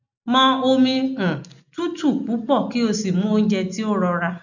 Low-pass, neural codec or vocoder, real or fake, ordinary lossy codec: 7.2 kHz; none; real; none